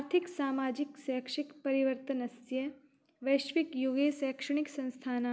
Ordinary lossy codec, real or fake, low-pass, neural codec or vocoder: none; real; none; none